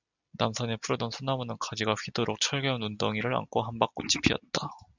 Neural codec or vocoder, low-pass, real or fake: none; 7.2 kHz; real